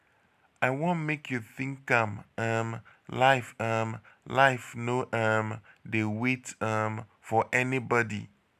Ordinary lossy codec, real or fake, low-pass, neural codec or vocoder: none; real; 14.4 kHz; none